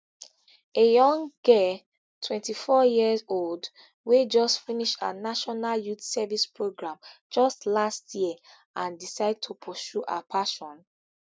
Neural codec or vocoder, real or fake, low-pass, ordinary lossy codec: none; real; none; none